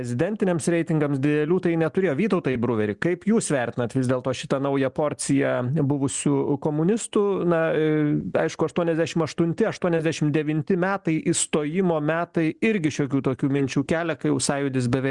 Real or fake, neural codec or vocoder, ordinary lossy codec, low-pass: real; none; Opus, 64 kbps; 10.8 kHz